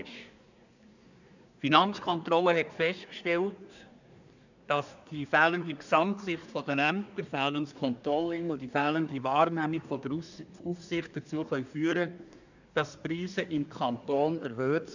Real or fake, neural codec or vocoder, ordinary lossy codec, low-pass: fake; codec, 24 kHz, 1 kbps, SNAC; none; 7.2 kHz